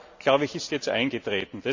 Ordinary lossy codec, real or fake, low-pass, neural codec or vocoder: AAC, 48 kbps; real; 7.2 kHz; none